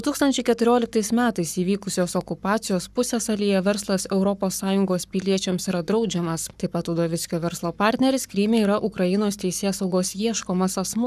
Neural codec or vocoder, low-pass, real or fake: codec, 44.1 kHz, 7.8 kbps, Pupu-Codec; 14.4 kHz; fake